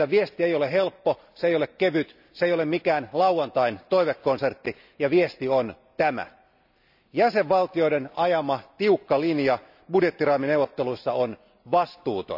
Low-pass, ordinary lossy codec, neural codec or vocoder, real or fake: 5.4 kHz; none; none; real